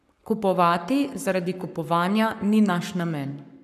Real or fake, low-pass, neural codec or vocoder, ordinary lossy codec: fake; 14.4 kHz; codec, 44.1 kHz, 7.8 kbps, Pupu-Codec; none